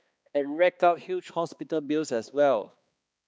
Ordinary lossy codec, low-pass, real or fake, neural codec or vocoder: none; none; fake; codec, 16 kHz, 2 kbps, X-Codec, HuBERT features, trained on balanced general audio